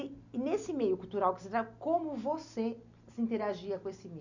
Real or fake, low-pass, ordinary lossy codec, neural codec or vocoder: real; 7.2 kHz; none; none